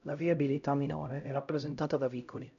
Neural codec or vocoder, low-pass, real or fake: codec, 16 kHz, 0.5 kbps, X-Codec, HuBERT features, trained on LibriSpeech; 7.2 kHz; fake